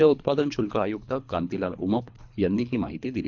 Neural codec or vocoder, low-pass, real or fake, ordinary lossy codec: codec, 24 kHz, 3 kbps, HILCodec; 7.2 kHz; fake; none